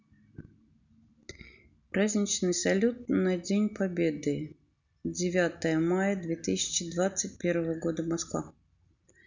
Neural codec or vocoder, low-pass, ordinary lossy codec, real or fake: none; 7.2 kHz; none; real